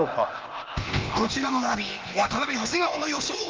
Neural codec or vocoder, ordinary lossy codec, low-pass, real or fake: codec, 16 kHz, 0.8 kbps, ZipCodec; Opus, 24 kbps; 7.2 kHz; fake